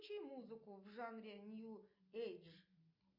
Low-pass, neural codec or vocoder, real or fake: 5.4 kHz; none; real